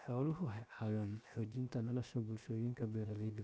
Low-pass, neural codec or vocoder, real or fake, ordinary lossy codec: none; codec, 16 kHz, 0.7 kbps, FocalCodec; fake; none